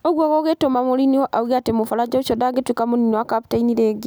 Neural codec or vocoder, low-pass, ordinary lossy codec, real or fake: none; none; none; real